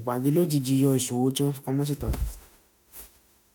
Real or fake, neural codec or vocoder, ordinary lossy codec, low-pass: fake; autoencoder, 48 kHz, 32 numbers a frame, DAC-VAE, trained on Japanese speech; none; none